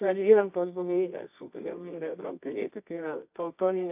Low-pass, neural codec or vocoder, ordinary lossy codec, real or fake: 3.6 kHz; codec, 24 kHz, 0.9 kbps, WavTokenizer, medium music audio release; AAC, 32 kbps; fake